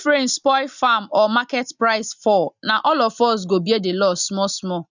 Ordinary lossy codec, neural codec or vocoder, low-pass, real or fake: none; none; 7.2 kHz; real